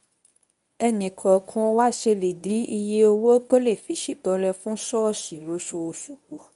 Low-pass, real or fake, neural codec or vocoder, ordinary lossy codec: 10.8 kHz; fake; codec, 24 kHz, 0.9 kbps, WavTokenizer, medium speech release version 1; none